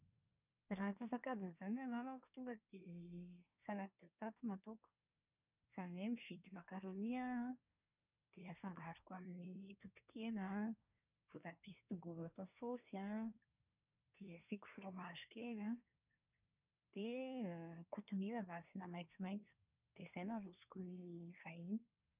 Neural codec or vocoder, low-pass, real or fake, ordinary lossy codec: codec, 24 kHz, 1 kbps, SNAC; 3.6 kHz; fake; none